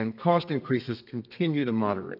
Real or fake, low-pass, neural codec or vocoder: fake; 5.4 kHz; codec, 32 kHz, 1.9 kbps, SNAC